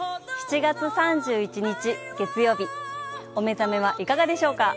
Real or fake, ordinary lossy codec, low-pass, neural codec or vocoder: real; none; none; none